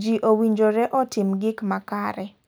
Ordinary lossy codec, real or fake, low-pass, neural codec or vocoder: none; real; none; none